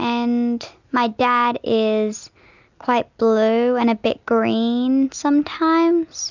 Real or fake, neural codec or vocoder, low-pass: real; none; 7.2 kHz